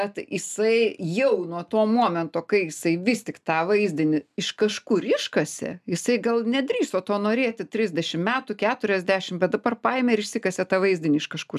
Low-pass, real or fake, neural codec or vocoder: 14.4 kHz; real; none